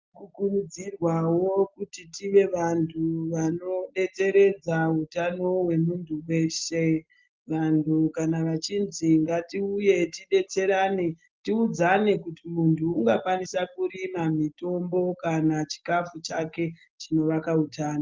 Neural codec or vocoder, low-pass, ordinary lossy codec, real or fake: none; 7.2 kHz; Opus, 32 kbps; real